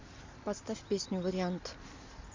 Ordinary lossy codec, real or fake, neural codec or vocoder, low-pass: MP3, 64 kbps; real; none; 7.2 kHz